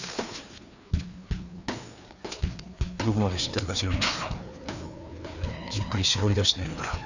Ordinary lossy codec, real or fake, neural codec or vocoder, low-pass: none; fake; codec, 16 kHz, 2 kbps, FreqCodec, larger model; 7.2 kHz